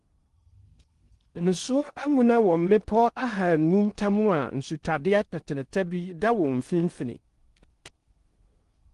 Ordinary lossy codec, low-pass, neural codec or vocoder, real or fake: Opus, 24 kbps; 10.8 kHz; codec, 16 kHz in and 24 kHz out, 0.6 kbps, FocalCodec, streaming, 4096 codes; fake